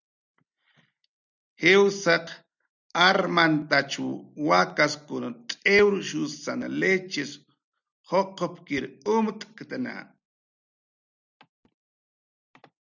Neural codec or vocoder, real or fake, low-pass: none; real; 7.2 kHz